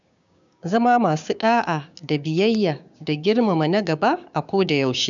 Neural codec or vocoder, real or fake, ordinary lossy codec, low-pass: codec, 16 kHz, 6 kbps, DAC; fake; none; 7.2 kHz